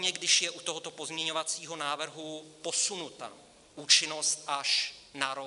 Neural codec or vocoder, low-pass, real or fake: none; 10.8 kHz; real